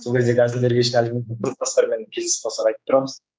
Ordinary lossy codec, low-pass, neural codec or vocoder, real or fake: none; none; codec, 16 kHz, 4 kbps, X-Codec, HuBERT features, trained on general audio; fake